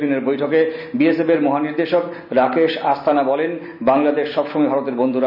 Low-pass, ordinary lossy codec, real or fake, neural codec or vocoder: 5.4 kHz; none; real; none